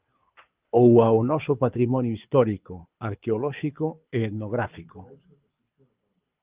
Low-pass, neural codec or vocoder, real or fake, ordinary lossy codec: 3.6 kHz; codec, 24 kHz, 6 kbps, HILCodec; fake; Opus, 24 kbps